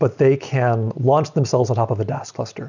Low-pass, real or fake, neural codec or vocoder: 7.2 kHz; real; none